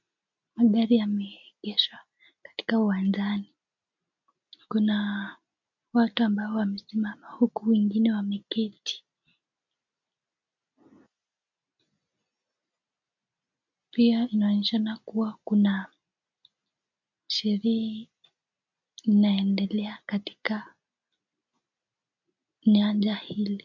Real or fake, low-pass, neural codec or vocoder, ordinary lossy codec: real; 7.2 kHz; none; MP3, 64 kbps